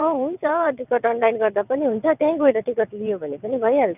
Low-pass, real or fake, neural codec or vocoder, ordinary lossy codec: 3.6 kHz; fake; vocoder, 22.05 kHz, 80 mel bands, WaveNeXt; none